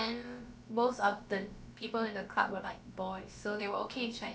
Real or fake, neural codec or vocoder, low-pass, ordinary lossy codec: fake; codec, 16 kHz, about 1 kbps, DyCAST, with the encoder's durations; none; none